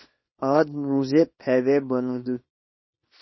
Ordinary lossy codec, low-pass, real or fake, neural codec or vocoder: MP3, 24 kbps; 7.2 kHz; fake; codec, 24 kHz, 0.9 kbps, WavTokenizer, small release